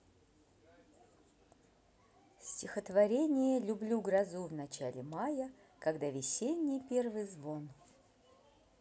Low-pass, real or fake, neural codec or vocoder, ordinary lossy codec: none; real; none; none